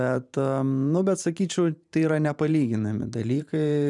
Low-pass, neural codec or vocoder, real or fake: 10.8 kHz; vocoder, 44.1 kHz, 128 mel bands every 512 samples, BigVGAN v2; fake